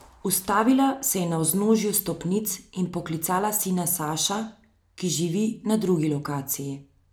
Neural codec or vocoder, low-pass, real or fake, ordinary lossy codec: none; none; real; none